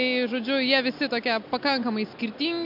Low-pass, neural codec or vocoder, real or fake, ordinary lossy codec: 5.4 kHz; none; real; MP3, 48 kbps